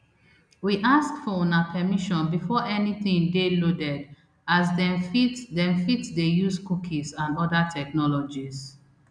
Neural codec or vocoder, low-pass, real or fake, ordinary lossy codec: none; 9.9 kHz; real; none